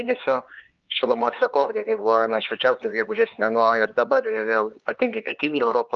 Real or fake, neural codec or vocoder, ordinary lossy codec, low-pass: fake; codec, 16 kHz, 4 kbps, X-Codec, HuBERT features, trained on LibriSpeech; Opus, 24 kbps; 7.2 kHz